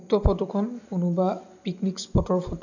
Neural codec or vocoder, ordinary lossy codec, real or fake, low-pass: none; none; real; 7.2 kHz